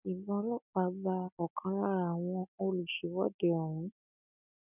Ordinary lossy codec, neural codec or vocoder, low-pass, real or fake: none; none; 3.6 kHz; real